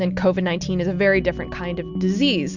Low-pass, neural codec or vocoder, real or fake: 7.2 kHz; none; real